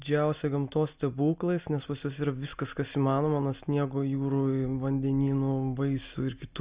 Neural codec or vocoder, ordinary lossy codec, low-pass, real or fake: none; Opus, 64 kbps; 3.6 kHz; real